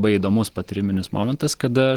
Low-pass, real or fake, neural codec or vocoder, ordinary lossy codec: 19.8 kHz; real; none; Opus, 16 kbps